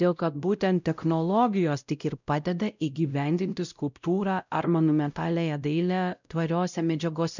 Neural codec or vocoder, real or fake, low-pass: codec, 16 kHz, 0.5 kbps, X-Codec, WavLM features, trained on Multilingual LibriSpeech; fake; 7.2 kHz